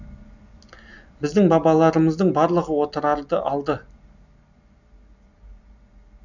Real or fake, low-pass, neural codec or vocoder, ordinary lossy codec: real; 7.2 kHz; none; none